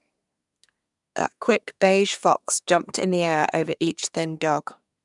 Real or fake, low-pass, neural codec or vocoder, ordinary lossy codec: fake; 10.8 kHz; codec, 24 kHz, 1 kbps, SNAC; none